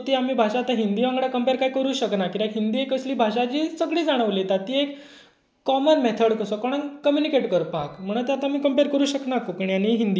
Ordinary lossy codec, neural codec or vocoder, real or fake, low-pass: none; none; real; none